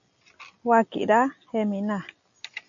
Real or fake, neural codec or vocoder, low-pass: real; none; 7.2 kHz